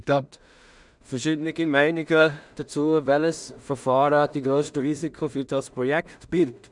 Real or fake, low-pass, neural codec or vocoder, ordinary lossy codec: fake; 10.8 kHz; codec, 16 kHz in and 24 kHz out, 0.4 kbps, LongCat-Audio-Codec, two codebook decoder; none